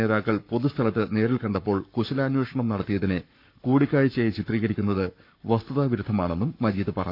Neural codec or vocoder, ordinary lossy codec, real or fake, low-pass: codec, 44.1 kHz, 7.8 kbps, Pupu-Codec; none; fake; 5.4 kHz